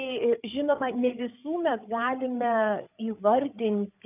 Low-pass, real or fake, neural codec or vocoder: 3.6 kHz; fake; codec, 16 kHz, 8 kbps, FreqCodec, larger model